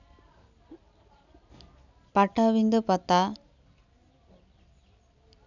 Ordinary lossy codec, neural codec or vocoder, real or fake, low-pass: none; none; real; 7.2 kHz